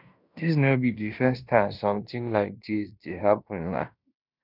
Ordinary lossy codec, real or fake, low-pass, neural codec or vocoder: none; fake; 5.4 kHz; codec, 16 kHz in and 24 kHz out, 0.9 kbps, LongCat-Audio-Codec, fine tuned four codebook decoder